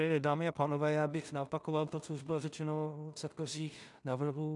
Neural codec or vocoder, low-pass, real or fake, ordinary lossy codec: codec, 16 kHz in and 24 kHz out, 0.4 kbps, LongCat-Audio-Codec, two codebook decoder; 10.8 kHz; fake; MP3, 96 kbps